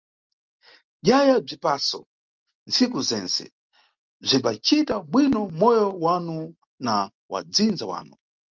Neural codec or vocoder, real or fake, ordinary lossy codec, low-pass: none; real; Opus, 32 kbps; 7.2 kHz